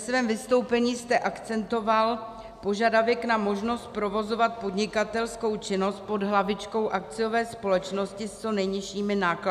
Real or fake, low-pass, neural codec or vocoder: real; 14.4 kHz; none